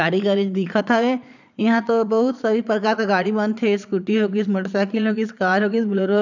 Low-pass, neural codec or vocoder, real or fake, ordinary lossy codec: 7.2 kHz; vocoder, 22.05 kHz, 80 mel bands, Vocos; fake; none